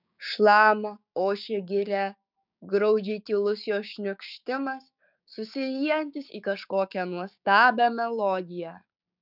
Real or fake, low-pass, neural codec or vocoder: fake; 5.4 kHz; codec, 16 kHz, 6 kbps, DAC